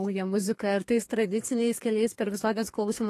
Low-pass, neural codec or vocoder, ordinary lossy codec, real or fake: 14.4 kHz; codec, 32 kHz, 1.9 kbps, SNAC; AAC, 48 kbps; fake